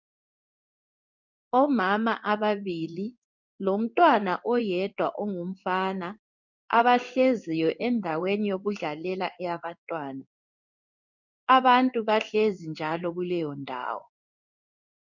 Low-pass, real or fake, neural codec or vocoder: 7.2 kHz; fake; codec, 16 kHz in and 24 kHz out, 1 kbps, XY-Tokenizer